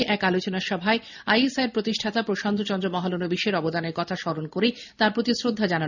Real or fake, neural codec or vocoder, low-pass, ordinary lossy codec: real; none; 7.2 kHz; none